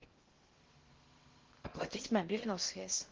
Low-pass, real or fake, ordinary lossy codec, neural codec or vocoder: 7.2 kHz; fake; Opus, 16 kbps; codec, 16 kHz in and 24 kHz out, 0.6 kbps, FocalCodec, streaming, 2048 codes